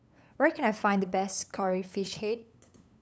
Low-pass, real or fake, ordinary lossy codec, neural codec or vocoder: none; fake; none; codec, 16 kHz, 8 kbps, FunCodec, trained on LibriTTS, 25 frames a second